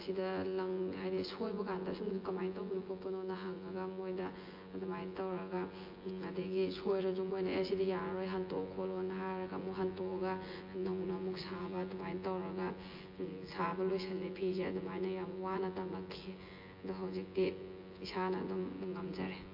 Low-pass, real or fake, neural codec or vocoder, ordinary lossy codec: 5.4 kHz; fake; vocoder, 24 kHz, 100 mel bands, Vocos; MP3, 32 kbps